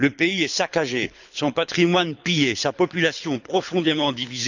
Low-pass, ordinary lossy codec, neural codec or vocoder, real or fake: 7.2 kHz; none; codec, 24 kHz, 6 kbps, HILCodec; fake